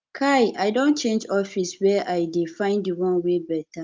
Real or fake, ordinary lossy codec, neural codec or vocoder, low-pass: real; Opus, 24 kbps; none; 7.2 kHz